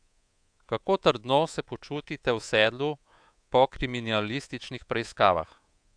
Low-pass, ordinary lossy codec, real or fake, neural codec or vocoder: 9.9 kHz; AAC, 64 kbps; fake; codec, 24 kHz, 3.1 kbps, DualCodec